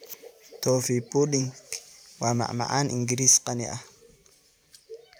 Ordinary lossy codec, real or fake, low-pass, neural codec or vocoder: none; fake; none; vocoder, 44.1 kHz, 128 mel bands every 512 samples, BigVGAN v2